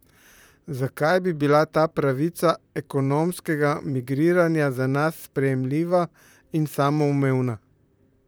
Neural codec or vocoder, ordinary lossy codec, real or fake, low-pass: vocoder, 44.1 kHz, 128 mel bands, Pupu-Vocoder; none; fake; none